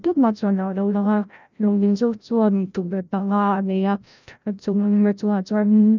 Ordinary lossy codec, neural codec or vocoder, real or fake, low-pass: none; codec, 16 kHz, 0.5 kbps, FreqCodec, larger model; fake; 7.2 kHz